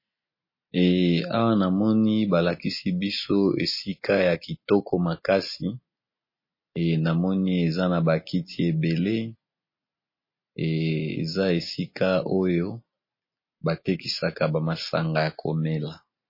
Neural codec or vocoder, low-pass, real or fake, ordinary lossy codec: none; 5.4 kHz; real; MP3, 24 kbps